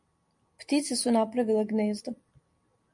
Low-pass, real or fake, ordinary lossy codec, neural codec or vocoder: 10.8 kHz; real; MP3, 64 kbps; none